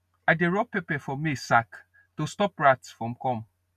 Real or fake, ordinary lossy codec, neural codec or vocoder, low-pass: real; none; none; 14.4 kHz